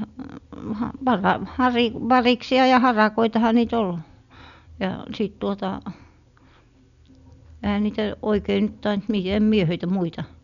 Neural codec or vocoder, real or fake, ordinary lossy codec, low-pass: none; real; none; 7.2 kHz